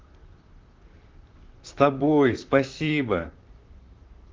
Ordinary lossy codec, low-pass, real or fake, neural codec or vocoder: Opus, 32 kbps; 7.2 kHz; fake; vocoder, 44.1 kHz, 128 mel bands, Pupu-Vocoder